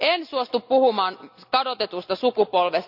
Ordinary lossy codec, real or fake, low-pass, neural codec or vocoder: none; real; 5.4 kHz; none